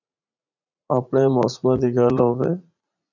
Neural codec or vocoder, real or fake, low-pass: vocoder, 44.1 kHz, 80 mel bands, Vocos; fake; 7.2 kHz